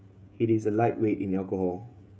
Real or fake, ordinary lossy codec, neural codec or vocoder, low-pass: fake; none; codec, 16 kHz, 16 kbps, FreqCodec, smaller model; none